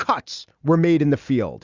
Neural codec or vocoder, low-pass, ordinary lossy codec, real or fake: none; 7.2 kHz; Opus, 64 kbps; real